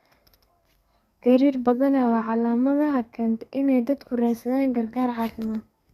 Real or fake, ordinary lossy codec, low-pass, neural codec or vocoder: fake; Opus, 64 kbps; 14.4 kHz; codec, 32 kHz, 1.9 kbps, SNAC